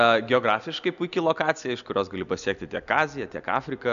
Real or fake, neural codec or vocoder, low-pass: real; none; 7.2 kHz